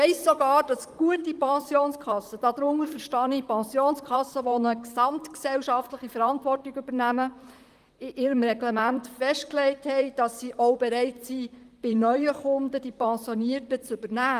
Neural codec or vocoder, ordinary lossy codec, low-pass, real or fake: vocoder, 44.1 kHz, 128 mel bands, Pupu-Vocoder; Opus, 32 kbps; 14.4 kHz; fake